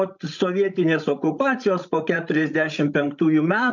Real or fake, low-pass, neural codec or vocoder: fake; 7.2 kHz; codec, 16 kHz, 16 kbps, FreqCodec, larger model